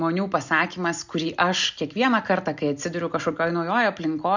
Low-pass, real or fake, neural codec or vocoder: 7.2 kHz; real; none